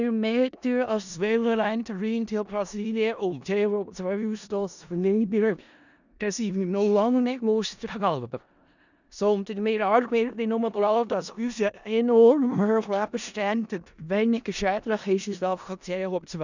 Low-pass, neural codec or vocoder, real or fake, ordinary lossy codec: 7.2 kHz; codec, 16 kHz in and 24 kHz out, 0.4 kbps, LongCat-Audio-Codec, four codebook decoder; fake; none